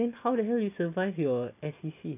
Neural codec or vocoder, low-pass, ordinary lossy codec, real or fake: none; 3.6 kHz; none; real